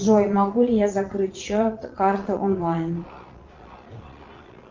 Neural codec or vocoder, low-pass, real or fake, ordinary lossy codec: vocoder, 22.05 kHz, 80 mel bands, Vocos; 7.2 kHz; fake; Opus, 32 kbps